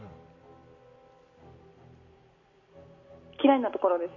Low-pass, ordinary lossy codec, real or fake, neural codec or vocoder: 7.2 kHz; none; real; none